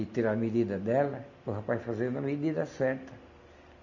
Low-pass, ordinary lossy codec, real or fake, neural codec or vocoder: 7.2 kHz; none; real; none